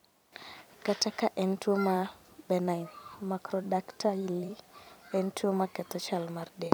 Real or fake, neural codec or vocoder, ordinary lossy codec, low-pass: fake; vocoder, 44.1 kHz, 128 mel bands every 256 samples, BigVGAN v2; none; none